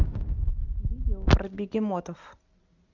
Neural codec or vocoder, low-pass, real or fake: none; 7.2 kHz; real